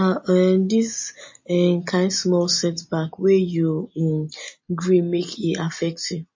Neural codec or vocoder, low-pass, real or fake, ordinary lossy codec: none; 7.2 kHz; real; MP3, 32 kbps